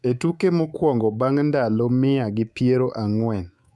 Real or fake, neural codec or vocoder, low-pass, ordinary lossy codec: fake; codec, 24 kHz, 3.1 kbps, DualCodec; none; none